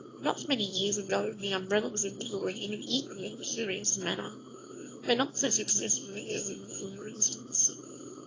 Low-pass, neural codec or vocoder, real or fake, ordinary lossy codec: 7.2 kHz; autoencoder, 22.05 kHz, a latent of 192 numbers a frame, VITS, trained on one speaker; fake; AAC, 32 kbps